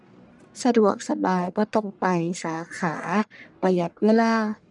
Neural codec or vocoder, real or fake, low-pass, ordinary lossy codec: codec, 44.1 kHz, 1.7 kbps, Pupu-Codec; fake; 10.8 kHz; none